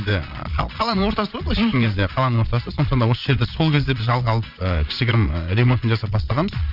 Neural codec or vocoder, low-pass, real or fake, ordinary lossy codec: vocoder, 22.05 kHz, 80 mel bands, Vocos; 5.4 kHz; fake; none